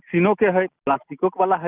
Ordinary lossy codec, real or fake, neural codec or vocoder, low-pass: Opus, 24 kbps; real; none; 3.6 kHz